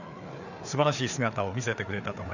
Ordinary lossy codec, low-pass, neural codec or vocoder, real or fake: none; 7.2 kHz; codec, 16 kHz, 4 kbps, FreqCodec, larger model; fake